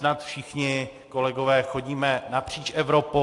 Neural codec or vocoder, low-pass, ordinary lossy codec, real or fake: none; 10.8 kHz; AAC, 48 kbps; real